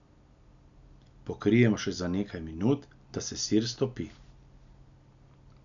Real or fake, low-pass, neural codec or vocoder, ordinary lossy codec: real; 7.2 kHz; none; none